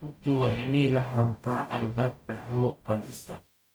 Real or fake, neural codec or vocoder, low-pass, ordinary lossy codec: fake; codec, 44.1 kHz, 0.9 kbps, DAC; none; none